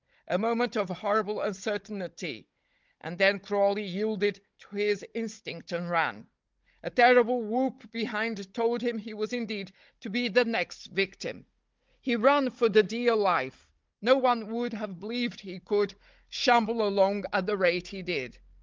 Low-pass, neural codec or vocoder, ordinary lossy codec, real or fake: 7.2 kHz; codec, 16 kHz, 16 kbps, FunCodec, trained on Chinese and English, 50 frames a second; Opus, 32 kbps; fake